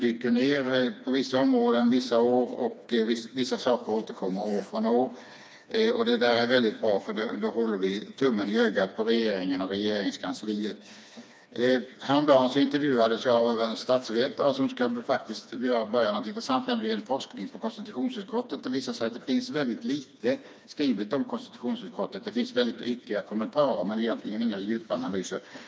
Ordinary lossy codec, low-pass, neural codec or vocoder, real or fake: none; none; codec, 16 kHz, 2 kbps, FreqCodec, smaller model; fake